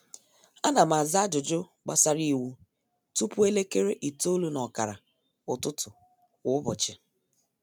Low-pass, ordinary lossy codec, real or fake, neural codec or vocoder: none; none; real; none